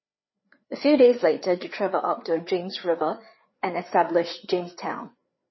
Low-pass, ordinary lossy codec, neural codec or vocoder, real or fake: 7.2 kHz; MP3, 24 kbps; codec, 16 kHz, 4 kbps, FreqCodec, larger model; fake